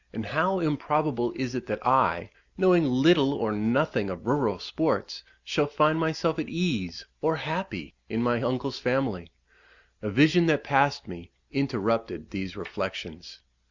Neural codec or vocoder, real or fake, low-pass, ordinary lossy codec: none; real; 7.2 kHz; Opus, 64 kbps